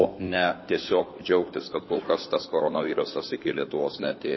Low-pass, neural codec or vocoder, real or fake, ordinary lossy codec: 7.2 kHz; codec, 16 kHz in and 24 kHz out, 2.2 kbps, FireRedTTS-2 codec; fake; MP3, 24 kbps